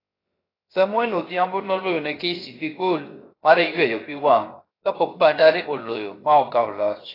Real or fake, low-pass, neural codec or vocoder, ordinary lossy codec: fake; 5.4 kHz; codec, 16 kHz, 0.7 kbps, FocalCodec; AAC, 24 kbps